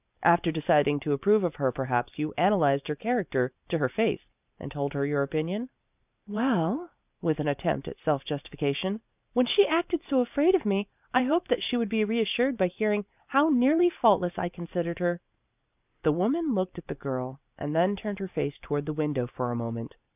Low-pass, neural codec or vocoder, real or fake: 3.6 kHz; vocoder, 44.1 kHz, 128 mel bands every 512 samples, BigVGAN v2; fake